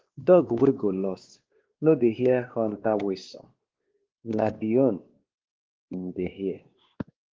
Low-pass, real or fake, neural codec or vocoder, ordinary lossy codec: 7.2 kHz; fake; codec, 16 kHz, 2 kbps, X-Codec, WavLM features, trained on Multilingual LibriSpeech; Opus, 16 kbps